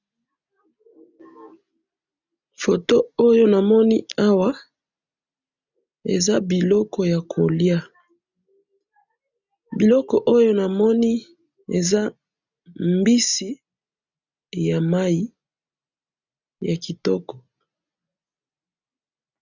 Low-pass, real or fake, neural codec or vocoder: 7.2 kHz; real; none